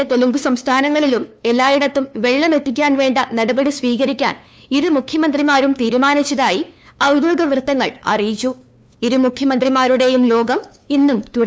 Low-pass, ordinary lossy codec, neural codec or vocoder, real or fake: none; none; codec, 16 kHz, 2 kbps, FunCodec, trained on LibriTTS, 25 frames a second; fake